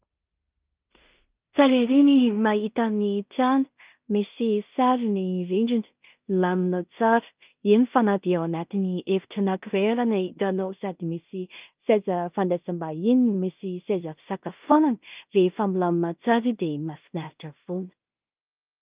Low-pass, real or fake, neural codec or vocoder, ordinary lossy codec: 3.6 kHz; fake; codec, 16 kHz in and 24 kHz out, 0.4 kbps, LongCat-Audio-Codec, two codebook decoder; Opus, 24 kbps